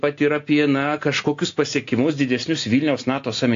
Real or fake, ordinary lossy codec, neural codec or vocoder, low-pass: real; AAC, 48 kbps; none; 7.2 kHz